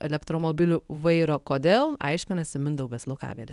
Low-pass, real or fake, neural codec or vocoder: 10.8 kHz; fake; codec, 24 kHz, 0.9 kbps, WavTokenizer, medium speech release version 1